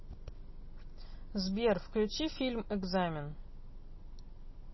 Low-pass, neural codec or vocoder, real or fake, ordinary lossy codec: 7.2 kHz; none; real; MP3, 24 kbps